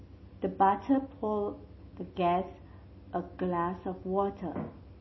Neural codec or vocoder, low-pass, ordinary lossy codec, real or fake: none; 7.2 kHz; MP3, 24 kbps; real